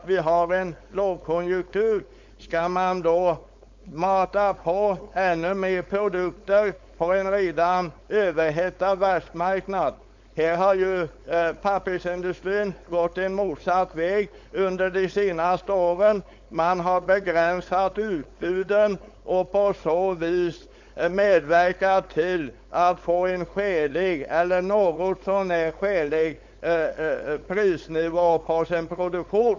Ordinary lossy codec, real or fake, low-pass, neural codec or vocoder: MP3, 64 kbps; fake; 7.2 kHz; codec, 16 kHz, 4.8 kbps, FACodec